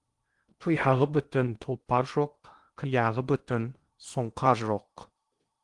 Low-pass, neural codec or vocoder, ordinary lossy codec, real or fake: 10.8 kHz; codec, 16 kHz in and 24 kHz out, 0.8 kbps, FocalCodec, streaming, 65536 codes; Opus, 24 kbps; fake